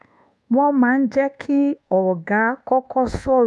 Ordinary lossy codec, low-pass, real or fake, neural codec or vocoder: none; none; fake; codec, 24 kHz, 1.2 kbps, DualCodec